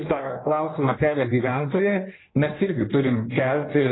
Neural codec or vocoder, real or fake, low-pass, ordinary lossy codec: codec, 16 kHz in and 24 kHz out, 1.1 kbps, FireRedTTS-2 codec; fake; 7.2 kHz; AAC, 16 kbps